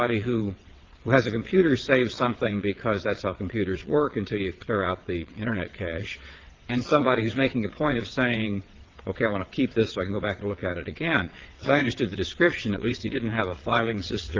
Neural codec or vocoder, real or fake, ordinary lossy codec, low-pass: vocoder, 22.05 kHz, 80 mel bands, WaveNeXt; fake; Opus, 16 kbps; 7.2 kHz